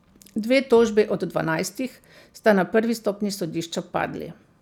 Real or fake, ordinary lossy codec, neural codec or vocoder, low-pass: real; none; none; 19.8 kHz